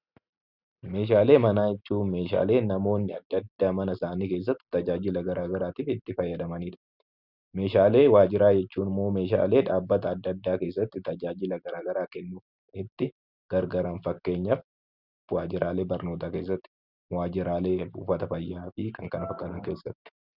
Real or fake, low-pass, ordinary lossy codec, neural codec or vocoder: real; 5.4 kHz; Opus, 64 kbps; none